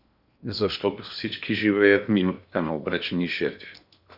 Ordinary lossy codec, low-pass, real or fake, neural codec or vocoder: Opus, 64 kbps; 5.4 kHz; fake; codec, 16 kHz in and 24 kHz out, 0.8 kbps, FocalCodec, streaming, 65536 codes